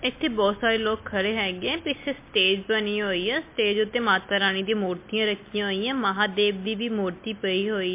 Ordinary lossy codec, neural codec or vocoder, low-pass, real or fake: MP3, 24 kbps; none; 3.6 kHz; real